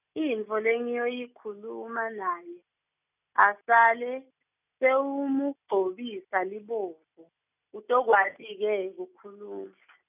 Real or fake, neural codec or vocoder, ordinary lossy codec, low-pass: real; none; none; 3.6 kHz